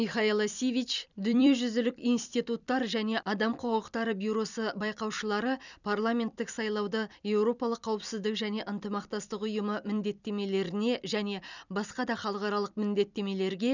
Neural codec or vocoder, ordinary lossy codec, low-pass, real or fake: vocoder, 44.1 kHz, 128 mel bands every 256 samples, BigVGAN v2; none; 7.2 kHz; fake